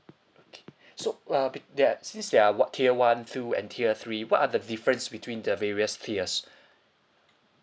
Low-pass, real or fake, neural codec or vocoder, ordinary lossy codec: none; real; none; none